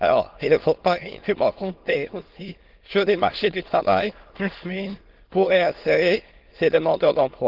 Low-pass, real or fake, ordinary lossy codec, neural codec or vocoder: 5.4 kHz; fake; Opus, 16 kbps; autoencoder, 22.05 kHz, a latent of 192 numbers a frame, VITS, trained on many speakers